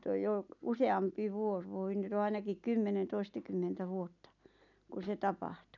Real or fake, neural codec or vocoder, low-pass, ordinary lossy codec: real; none; 7.2 kHz; none